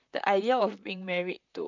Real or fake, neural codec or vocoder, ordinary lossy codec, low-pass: fake; vocoder, 44.1 kHz, 128 mel bands, Pupu-Vocoder; none; 7.2 kHz